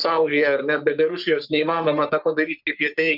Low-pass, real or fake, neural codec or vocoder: 5.4 kHz; fake; codec, 44.1 kHz, 3.4 kbps, Pupu-Codec